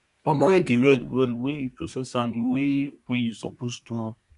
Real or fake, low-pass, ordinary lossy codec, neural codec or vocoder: fake; 10.8 kHz; none; codec, 24 kHz, 1 kbps, SNAC